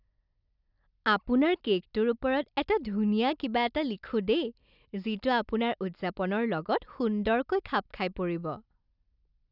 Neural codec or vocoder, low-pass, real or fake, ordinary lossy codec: none; 5.4 kHz; real; none